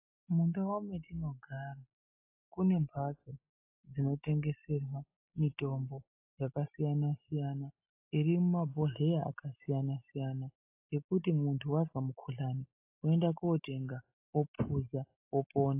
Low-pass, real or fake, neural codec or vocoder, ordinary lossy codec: 3.6 kHz; real; none; AAC, 32 kbps